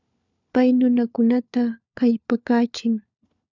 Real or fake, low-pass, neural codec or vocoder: fake; 7.2 kHz; codec, 16 kHz, 4 kbps, FunCodec, trained on LibriTTS, 50 frames a second